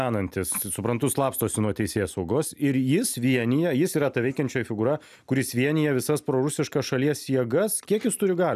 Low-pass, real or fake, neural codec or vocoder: 14.4 kHz; real; none